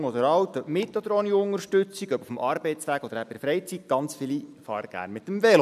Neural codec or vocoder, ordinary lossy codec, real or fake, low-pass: none; none; real; 14.4 kHz